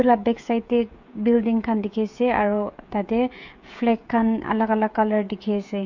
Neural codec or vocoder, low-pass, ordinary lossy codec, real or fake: codec, 16 kHz, 16 kbps, FreqCodec, smaller model; 7.2 kHz; MP3, 48 kbps; fake